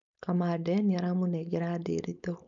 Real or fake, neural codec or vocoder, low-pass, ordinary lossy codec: fake; codec, 16 kHz, 4.8 kbps, FACodec; 7.2 kHz; none